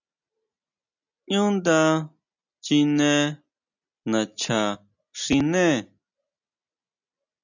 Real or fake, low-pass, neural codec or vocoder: real; 7.2 kHz; none